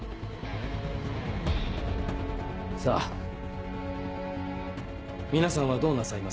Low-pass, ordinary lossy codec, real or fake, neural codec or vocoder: none; none; real; none